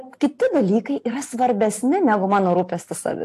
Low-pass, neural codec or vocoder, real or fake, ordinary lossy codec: 14.4 kHz; none; real; AAC, 64 kbps